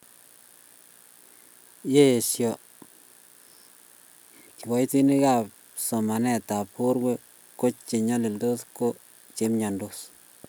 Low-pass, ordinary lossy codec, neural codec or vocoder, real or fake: none; none; none; real